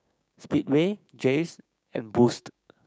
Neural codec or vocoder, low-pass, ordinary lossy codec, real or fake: codec, 16 kHz, 6 kbps, DAC; none; none; fake